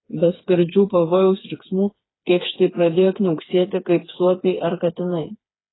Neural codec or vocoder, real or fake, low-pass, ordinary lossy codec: codec, 16 kHz, 4 kbps, FreqCodec, smaller model; fake; 7.2 kHz; AAC, 16 kbps